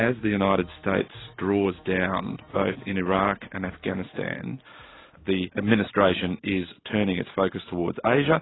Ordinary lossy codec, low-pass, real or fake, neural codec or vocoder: AAC, 16 kbps; 7.2 kHz; real; none